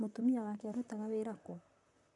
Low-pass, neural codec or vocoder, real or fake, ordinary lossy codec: 10.8 kHz; vocoder, 44.1 kHz, 128 mel bands, Pupu-Vocoder; fake; none